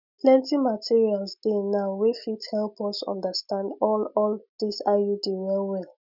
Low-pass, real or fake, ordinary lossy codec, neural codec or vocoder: 5.4 kHz; real; none; none